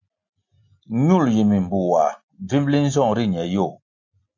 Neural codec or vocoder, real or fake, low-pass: none; real; 7.2 kHz